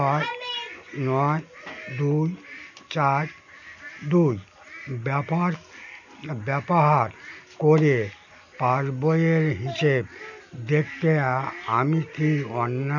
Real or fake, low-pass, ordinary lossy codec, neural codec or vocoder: real; 7.2 kHz; none; none